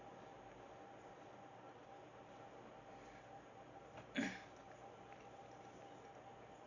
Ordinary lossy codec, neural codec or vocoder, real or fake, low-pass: none; none; real; 7.2 kHz